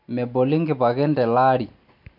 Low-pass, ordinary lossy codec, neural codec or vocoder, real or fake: 5.4 kHz; MP3, 48 kbps; none; real